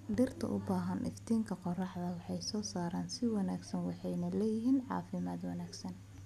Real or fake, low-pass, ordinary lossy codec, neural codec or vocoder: real; 14.4 kHz; none; none